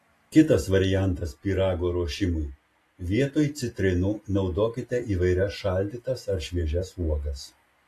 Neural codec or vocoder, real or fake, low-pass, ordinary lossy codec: none; real; 14.4 kHz; AAC, 48 kbps